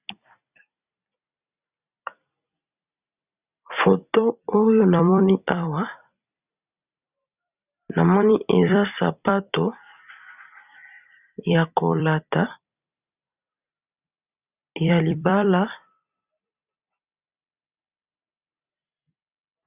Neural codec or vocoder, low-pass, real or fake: vocoder, 44.1 kHz, 128 mel bands every 256 samples, BigVGAN v2; 3.6 kHz; fake